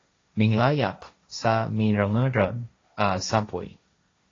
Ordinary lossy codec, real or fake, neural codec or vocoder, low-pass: AAC, 32 kbps; fake; codec, 16 kHz, 1.1 kbps, Voila-Tokenizer; 7.2 kHz